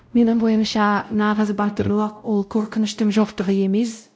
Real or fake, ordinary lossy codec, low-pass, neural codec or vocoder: fake; none; none; codec, 16 kHz, 0.5 kbps, X-Codec, WavLM features, trained on Multilingual LibriSpeech